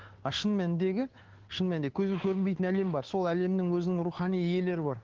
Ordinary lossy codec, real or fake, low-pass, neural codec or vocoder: Opus, 16 kbps; fake; 7.2 kHz; codec, 16 kHz, 2 kbps, FunCodec, trained on Chinese and English, 25 frames a second